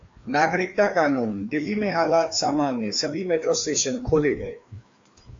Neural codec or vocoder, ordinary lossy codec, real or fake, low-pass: codec, 16 kHz, 2 kbps, FreqCodec, larger model; AAC, 48 kbps; fake; 7.2 kHz